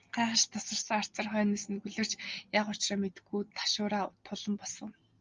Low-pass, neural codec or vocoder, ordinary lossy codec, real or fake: 7.2 kHz; none; Opus, 32 kbps; real